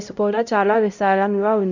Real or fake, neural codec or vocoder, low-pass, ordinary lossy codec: fake; codec, 16 kHz, 0.5 kbps, X-Codec, HuBERT features, trained on LibriSpeech; 7.2 kHz; none